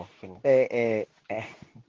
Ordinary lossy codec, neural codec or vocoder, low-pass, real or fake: Opus, 16 kbps; codec, 24 kHz, 0.9 kbps, WavTokenizer, medium speech release version 2; 7.2 kHz; fake